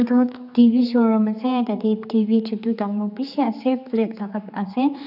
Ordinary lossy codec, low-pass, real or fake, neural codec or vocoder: none; 5.4 kHz; fake; codec, 16 kHz, 4 kbps, X-Codec, HuBERT features, trained on general audio